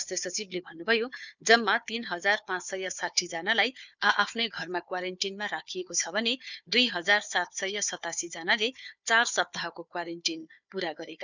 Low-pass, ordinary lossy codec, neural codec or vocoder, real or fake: 7.2 kHz; none; codec, 16 kHz, 4 kbps, FunCodec, trained on Chinese and English, 50 frames a second; fake